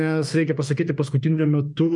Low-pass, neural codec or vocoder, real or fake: 10.8 kHz; autoencoder, 48 kHz, 32 numbers a frame, DAC-VAE, trained on Japanese speech; fake